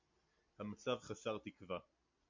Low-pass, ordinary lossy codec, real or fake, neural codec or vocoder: 7.2 kHz; MP3, 48 kbps; real; none